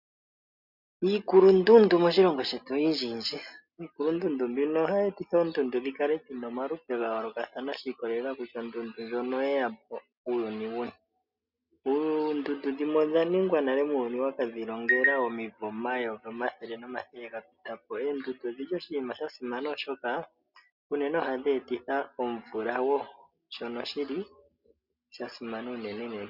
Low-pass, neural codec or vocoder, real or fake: 5.4 kHz; none; real